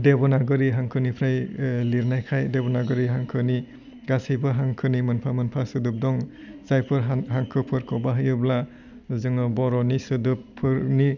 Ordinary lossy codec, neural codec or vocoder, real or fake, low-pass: none; none; real; 7.2 kHz